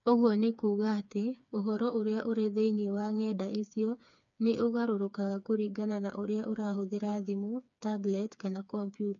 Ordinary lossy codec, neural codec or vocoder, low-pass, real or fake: none; codec, 16 kHz, 4 kbps, FreqCodec, smaller model; 7.2 kHz; fake